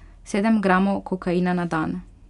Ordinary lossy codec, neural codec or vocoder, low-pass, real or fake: none; none; 10.8 kHz; real